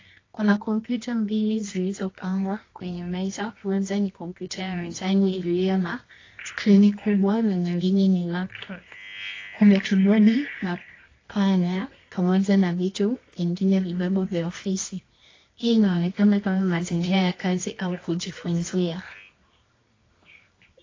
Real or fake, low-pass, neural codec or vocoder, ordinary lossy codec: fake; 7.2 kHz; codec, 24 kHz, 0.9 kbps, WavTokenizer, medium music audio release; AAC, 32 kbps